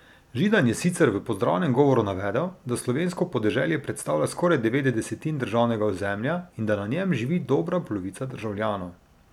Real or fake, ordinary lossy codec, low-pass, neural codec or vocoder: real; none; 19.8 kHz; none